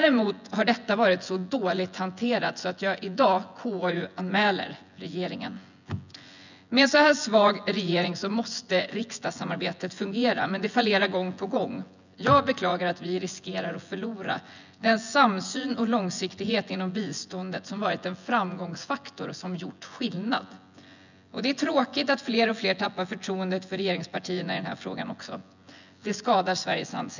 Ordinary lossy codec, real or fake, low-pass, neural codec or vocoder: none; fake; 7.2 kHz; vocoder, 24 kHz, 100 mel bands, Vocos